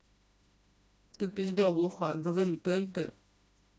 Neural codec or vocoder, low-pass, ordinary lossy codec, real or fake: codec, 16 kHz, 1 kbps, FreqCodec, smaller model; none; none; fake